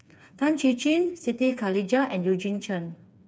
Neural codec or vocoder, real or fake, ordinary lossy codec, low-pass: codec, 16 kHz, 4 kbps, FreqCodec, smaller model; fake; none; none